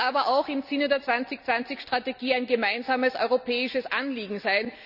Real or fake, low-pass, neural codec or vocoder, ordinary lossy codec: real; 5.4 kHz; none; none